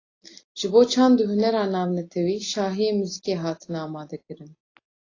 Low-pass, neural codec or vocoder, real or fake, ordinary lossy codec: 7.2 kHz; none; real; AAC, 32 kbps